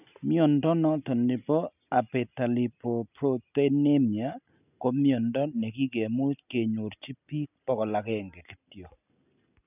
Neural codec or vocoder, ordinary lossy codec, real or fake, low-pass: none; none; real; 3.6 kHz